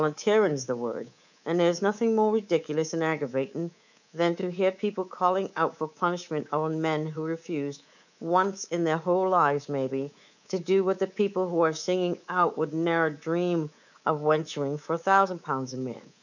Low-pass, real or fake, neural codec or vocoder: 7.2 kHz; fake; codec, 24 kHz, 3.1 kbps, DualCodec